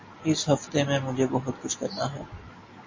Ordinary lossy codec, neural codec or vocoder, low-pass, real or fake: MP3, 32 kbps; none; 7.2 kHz; real